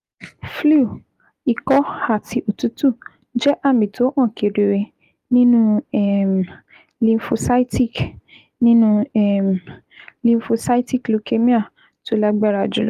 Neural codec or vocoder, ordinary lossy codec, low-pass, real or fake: none; Opus, 32 kbps; 14.4 kHz; real